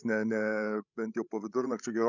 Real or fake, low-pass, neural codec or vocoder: fake; 7.2 kHz; codec, 16 kHz, 16 kbps, FreqCodec, larger model